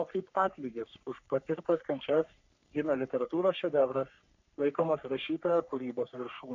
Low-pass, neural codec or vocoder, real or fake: 7.2 kHz; codec, 44.1 kHz, 3.4 kbps, Pupu-Codec; fake